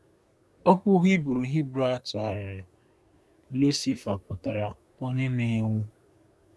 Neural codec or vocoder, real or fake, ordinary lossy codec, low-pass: codec, 24 kHz, 1 kbps, SNAC; fake; none; none